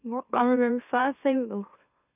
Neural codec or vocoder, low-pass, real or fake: autoencoder, 44.1 kHz, a latent of 192 numbers a frame, MeloTTS; 3.6 kHz; fake